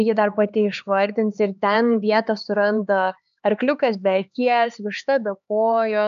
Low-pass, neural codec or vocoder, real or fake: 7.2 kHz; codec, 16 kHz, 4 kbps, X-Codec, HuBERT features, trained on LibriSpeech; fake